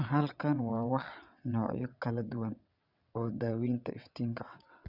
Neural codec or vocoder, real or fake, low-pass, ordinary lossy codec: vocoder, 22.05 kHz, 80 mel bands, WaveNeXt; fake; 5.4 kHz; none